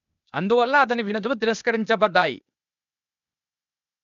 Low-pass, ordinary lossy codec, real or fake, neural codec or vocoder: 7.2 kHz; none; fake; codec, 16 kHz, 0.8 kbps, ZipCodec